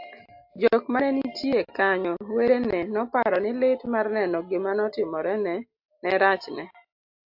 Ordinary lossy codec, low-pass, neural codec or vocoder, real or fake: AAC, 48 kbps; 5.4 kHz; none; real